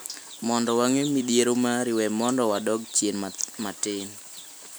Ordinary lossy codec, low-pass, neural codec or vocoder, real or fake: none; none; none; real